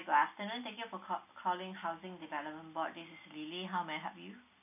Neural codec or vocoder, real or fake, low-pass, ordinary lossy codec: none; real; 3.6 kHz; none